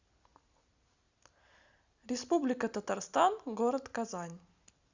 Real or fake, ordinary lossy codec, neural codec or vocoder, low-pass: real; Opus, 64 kbps; none; 7.2 kHz